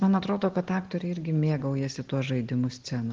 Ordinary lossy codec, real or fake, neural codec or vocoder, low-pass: Opus, 16 kbps; real; none; 7.2 kHz